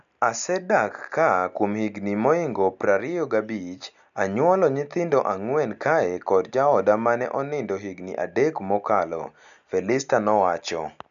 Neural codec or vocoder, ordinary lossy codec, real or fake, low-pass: none; none; real; 7.2 kHz